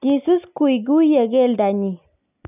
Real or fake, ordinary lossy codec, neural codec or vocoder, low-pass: real; none; none; 3.6 kHz